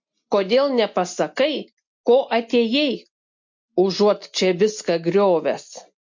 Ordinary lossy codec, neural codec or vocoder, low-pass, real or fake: MP3, 48 kbps; none; 7.2 kHz; real